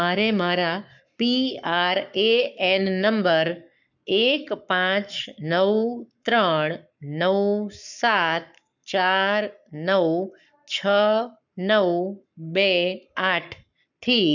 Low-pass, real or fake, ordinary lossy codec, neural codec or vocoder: 7.2 kHz; fake; none; codec, 44.1 kHz, 7.8 kbps, Pupu-Codec